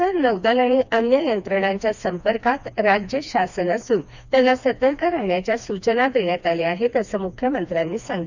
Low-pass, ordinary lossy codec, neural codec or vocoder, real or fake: 7.2 kHz; none; codec, 16 kHz, 2 kbps, FreqCodec, smaller model; fake